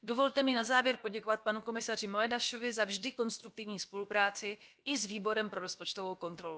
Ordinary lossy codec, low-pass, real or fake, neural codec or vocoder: none; none; fake; codec, 16 kHz, about 1 kbps, DyCAST, with the encoder's durations